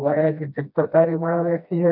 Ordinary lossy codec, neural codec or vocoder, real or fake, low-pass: none; codec, 16 kHz, 1 kbps, FreqCodec, smaller model; fake; 5.4 kHz